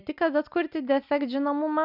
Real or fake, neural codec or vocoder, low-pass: real; none; 5.4 kHz